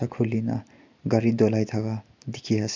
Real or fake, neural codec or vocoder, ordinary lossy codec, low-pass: real; none; MP3, 64 kbps; 7.2 kHz